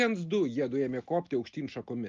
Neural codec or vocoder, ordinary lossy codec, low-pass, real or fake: none; Opus, 32 kbps; 7.2 kHz; real